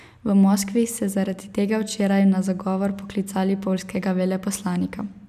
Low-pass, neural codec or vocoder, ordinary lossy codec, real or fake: 14.4 kHz; none; none; real